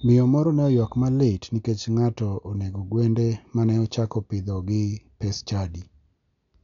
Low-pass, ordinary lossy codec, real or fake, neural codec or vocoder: 7.2 kHz; none; real; none